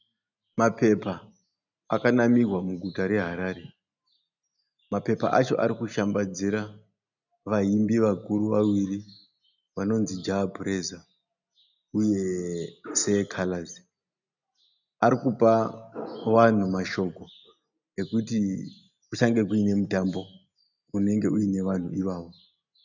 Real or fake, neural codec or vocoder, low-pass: real; none; 7.2 kHz